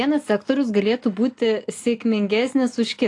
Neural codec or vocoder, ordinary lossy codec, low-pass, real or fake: none; AAC, 48 kbps; 10.8 kHz; real